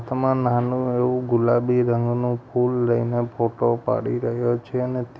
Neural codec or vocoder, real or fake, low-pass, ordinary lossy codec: none; real; none; none